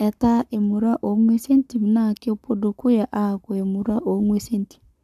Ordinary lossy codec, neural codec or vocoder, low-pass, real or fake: none; codec, 44.1 kHz, 7.8 kbps, Pupu-Codec; 14.4 kHz; fake